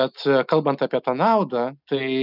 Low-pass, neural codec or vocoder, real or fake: 5.4 kHz; none; real